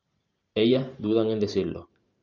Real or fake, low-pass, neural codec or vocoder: fake; 7.2 kHz; vocoder, 44.1 kHz, 128 mel bands every 512 samples, BigVGAN v2